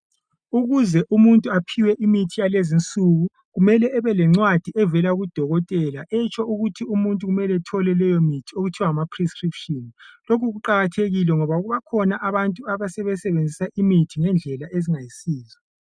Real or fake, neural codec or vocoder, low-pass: real; none; 9.9 kHz